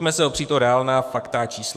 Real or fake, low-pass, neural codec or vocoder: fake; 14.4 kHz; codec, 44.1 kHz, 7.8 kbps, DAC